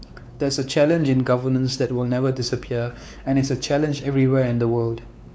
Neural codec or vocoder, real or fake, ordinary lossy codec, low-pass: codec, 16 kHz, 4 kbps, X-Codec, WavLM features, trained on Multilingual LibriSpeech; fake; none; none